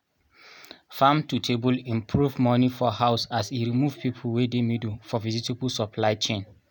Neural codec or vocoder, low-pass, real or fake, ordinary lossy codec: none; none; real; none